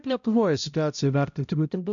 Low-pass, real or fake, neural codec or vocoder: 7.2 kHz; fake; codec, 16 kHz, 0.5 kbps, X-Codec, HuBERT features, trained on balanced general audio